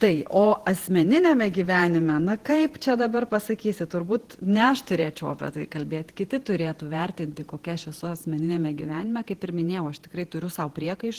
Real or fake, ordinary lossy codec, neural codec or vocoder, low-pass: fake; Opus, 16 kbps; vocoder, 48 kHz, 128 mel bands, Vocos; 14.4 kHz